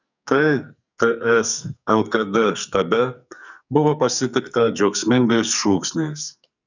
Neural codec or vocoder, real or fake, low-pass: codec, 44.1 kHz, 2.6 kbps, SNAC; fake; 7.2 kHz